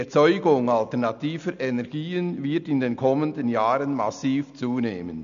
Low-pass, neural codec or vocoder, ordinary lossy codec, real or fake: 7.2 kHz; none; none; real